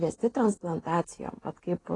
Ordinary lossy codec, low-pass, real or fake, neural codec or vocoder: AAC, 32 kbps; 10.8 kHz; real; none